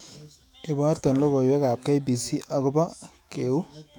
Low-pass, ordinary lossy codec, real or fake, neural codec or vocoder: 19.8 kHz; none; fake; autoencoder, 48 kHz, 128 numbers a frame, DAC-VAE, trained on Japanese speech